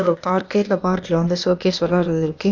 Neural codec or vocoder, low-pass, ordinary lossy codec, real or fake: codec, 16 kHz, 0.8 kbps, ZipCodec; 7.2 kHz; none; fake